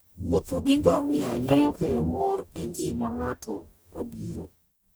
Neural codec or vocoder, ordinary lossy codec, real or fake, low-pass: codec, 44.1 kHz, 0.9 kbps, DAC; none; fake; none